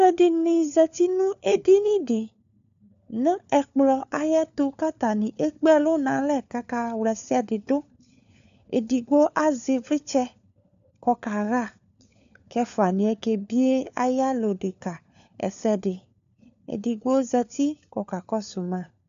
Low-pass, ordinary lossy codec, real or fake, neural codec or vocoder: 7.2 kHz; AAC, 96 kbps; fake; codec, 16 kHz, 4 kbps, FunCodec, trained on LibriTTS, 50 frames a second